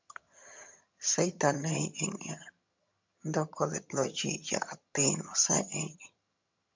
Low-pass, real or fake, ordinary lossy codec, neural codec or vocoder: 7.2 kHz; fake; MP3, 64 kbps; vocoder, 22.05 kHz, 80 mel bands, HiFi-GAN